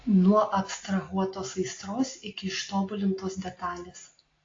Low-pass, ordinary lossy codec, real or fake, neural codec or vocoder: 7.2 kHz; AAC, 32 kbps; real; none